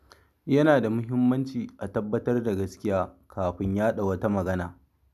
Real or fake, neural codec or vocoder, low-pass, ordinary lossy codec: real; none; 14.4 kHz; none